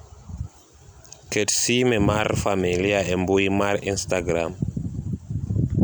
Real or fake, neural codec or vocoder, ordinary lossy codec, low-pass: real; none; none; none